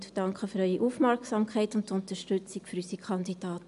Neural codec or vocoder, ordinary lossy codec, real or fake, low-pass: none; none; real; 10.8 kHz